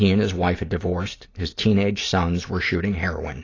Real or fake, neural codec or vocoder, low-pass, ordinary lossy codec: real; none; 7.2 kHz; AAC, 32 kbps